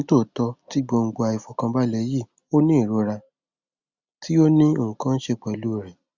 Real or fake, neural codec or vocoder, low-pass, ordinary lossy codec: real; none; 7.2 kHz; Opus, 64 kbps